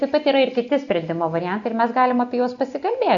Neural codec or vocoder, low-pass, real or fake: none; 7.2 kHz; real